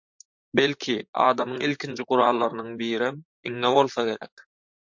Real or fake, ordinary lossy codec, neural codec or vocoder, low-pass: fake; MP3, 48 kbps; vocoder, 44.1 kHz, 128 mel bands, Pupu-Vocoder; 7.2 kHz